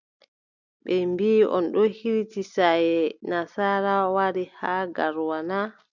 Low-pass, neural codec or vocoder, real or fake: 7.2 kHz; none; real